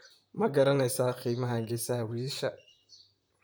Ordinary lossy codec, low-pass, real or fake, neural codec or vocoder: none; none; fake; vocoder, 44.1 kHz, 128 mel bands, Pupu-Vocoder